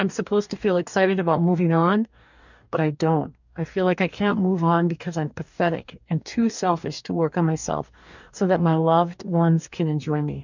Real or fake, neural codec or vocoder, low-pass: fake; codec, 44.1 kHz, 2.6 kbps, DAC; 7.2 kHz